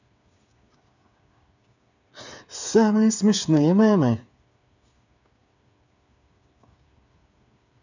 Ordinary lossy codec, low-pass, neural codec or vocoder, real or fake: none; 7.2 kHz; codec, 16 kHz, 8 kbps, FreqCodec, smaller model; fake